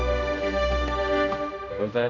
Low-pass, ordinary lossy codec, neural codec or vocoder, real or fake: 7.2 kHz; none; codec, 16 kHz, 1 kbps, X-Codec, HuBERT features, trained on general audio; fake